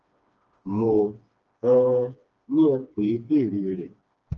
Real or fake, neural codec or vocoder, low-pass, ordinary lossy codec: fake; codec, 16 kHz, 2 kbps, FreqCodec, smaller model; 7.2 kHz; Opus, 24 kbps